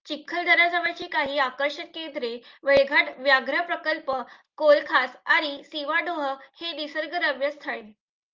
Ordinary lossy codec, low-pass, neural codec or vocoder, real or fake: Opus, 24 kbps; 7.2 kHz; none; real